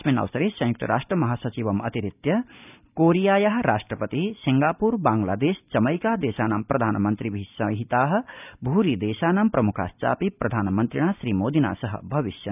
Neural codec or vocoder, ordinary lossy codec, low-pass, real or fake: none; none; 3.6 kHz; real